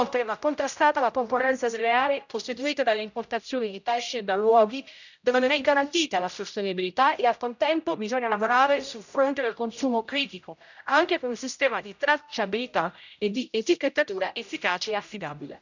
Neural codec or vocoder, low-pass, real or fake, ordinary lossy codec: codec, 16 kHz, 0.5 kbps, X-Codec, HuBERT features, trained on general audio; 7.2 kHz; fake; none